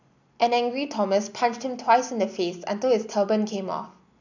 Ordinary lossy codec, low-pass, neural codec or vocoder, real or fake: none; 7.2 kHz; none; real